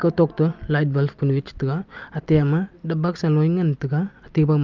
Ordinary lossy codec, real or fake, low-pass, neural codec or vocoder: Opus, 32 kbps; real; 7.2 kHz; none